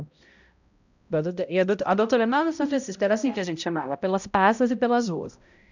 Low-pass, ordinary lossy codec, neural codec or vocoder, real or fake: 7.2 kHz; none; codec, 16 kHz, 0.5 kbps, X-Codec, HuBERT features, trained on balanced general audio; fake